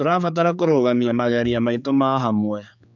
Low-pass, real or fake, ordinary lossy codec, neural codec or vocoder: 7.2 kHz; fake; none; codec, 16 kHz, 4 kbps, X-Codec, HuBERT features, trained on general audio